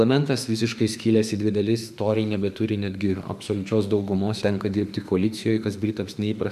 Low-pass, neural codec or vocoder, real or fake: 14.4 kHz; autoencoder, 48 kHz, 32 numbers a frame, DAC-VAE, trained on Japanese speech; fake